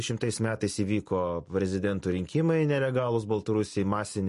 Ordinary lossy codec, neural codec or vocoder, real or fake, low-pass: MP3, 48 kbps; none; real; 14.4 kHz